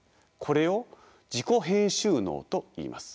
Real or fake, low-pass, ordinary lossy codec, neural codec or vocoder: real; none; none; none